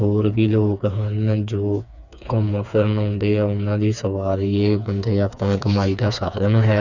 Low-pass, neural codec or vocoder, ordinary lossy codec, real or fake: 7.2 kHz; codec, 16 kHz, 4 kbps, FreqCodec, smaller model; none; fake